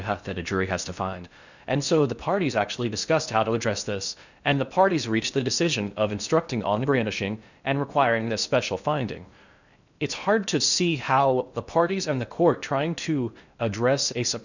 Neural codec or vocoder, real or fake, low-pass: codec, 16 kHz in and 24 kHz out, 0.6 kbps, FocalCodec, streaming, 4096 codes; fake; 7.2 kHz